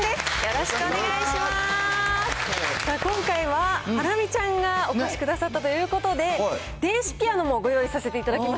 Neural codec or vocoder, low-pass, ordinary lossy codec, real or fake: none; none; none; real